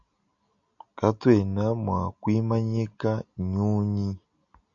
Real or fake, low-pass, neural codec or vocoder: real; 7.2 kHz; none